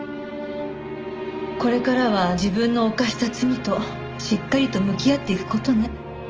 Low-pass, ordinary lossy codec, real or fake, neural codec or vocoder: 7.2 kHz; Opus, 24 kbps; real; none